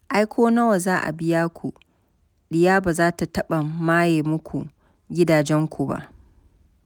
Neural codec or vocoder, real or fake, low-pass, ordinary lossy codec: none; real; none; none